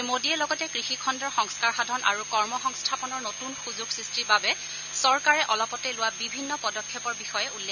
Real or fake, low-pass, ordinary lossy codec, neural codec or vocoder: real; 7.2 kHz; none; none